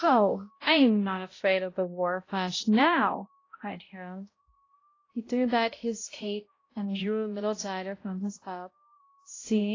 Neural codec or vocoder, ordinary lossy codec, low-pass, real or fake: codec, 16 kHz, 0.5 kbps, X-Codec, HuBERT features, trained on balanced general audio; AAC, 32 kbps; 7.2 kHz; fake